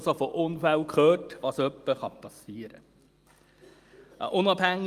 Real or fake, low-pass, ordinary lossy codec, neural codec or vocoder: real; 14.4 kHz; Opus, 32 kbps; none